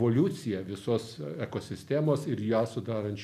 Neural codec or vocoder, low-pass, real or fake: none; 14.4 kHz; real